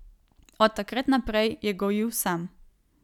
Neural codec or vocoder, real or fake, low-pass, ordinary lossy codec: none; real; 19.8 kHz; none